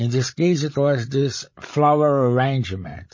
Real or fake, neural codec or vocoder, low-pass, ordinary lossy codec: fake; codec, 16 kHz, 16 kbps, FreqCodec, larger model; 7.2 kHz; MP3, 32 kbps